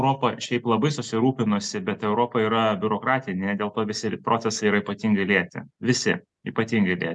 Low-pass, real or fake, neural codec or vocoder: 10.8 kHz; real; none